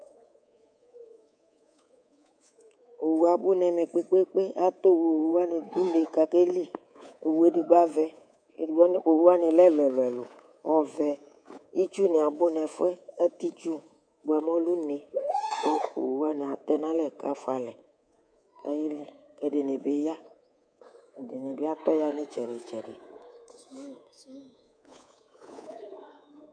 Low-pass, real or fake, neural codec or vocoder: 9.9 kHz; fake; codec, 24 kHz, 3.1 kbps, DualCodec